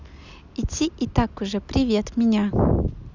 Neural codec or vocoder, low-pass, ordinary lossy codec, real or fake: none; 7.2 kHz; Opus, 64 kbps; real